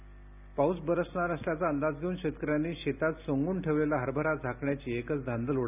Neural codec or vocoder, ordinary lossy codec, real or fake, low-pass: none; none; real; 3.6 kHz